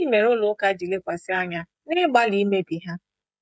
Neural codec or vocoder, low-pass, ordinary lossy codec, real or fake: codec, 16 kHz, 16 kbps, FreqCodec, smaller model; none; none; fake